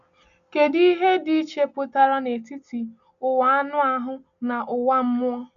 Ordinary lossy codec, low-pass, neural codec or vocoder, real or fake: none; 7.2 kHz; none; real